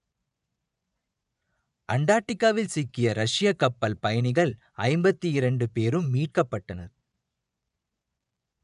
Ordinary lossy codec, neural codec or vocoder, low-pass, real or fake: none; vocoder, 24 kHz, 100 mel bands, Vocos; 10.8 kHz; fake